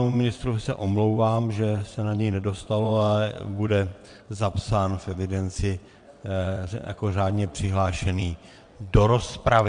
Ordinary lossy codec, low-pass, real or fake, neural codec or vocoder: MP3, 64 kbps; 9.9 kHz; fake; vocoder, 22.05 kHz, 80 mel bands, WaveNeXt